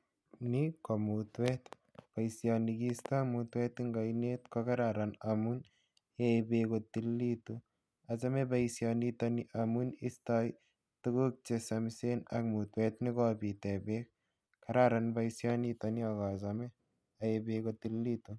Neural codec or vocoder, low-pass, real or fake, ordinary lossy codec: none; none; real; none